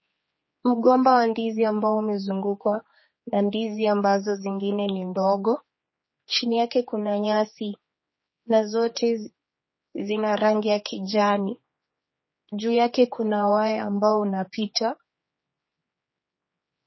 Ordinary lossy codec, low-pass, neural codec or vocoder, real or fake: MP3, 24 kbps; 7.2 kHz; codec, 16 kHz, 4 kbps, X-Codec, HuBERT features, trained on general audio; fake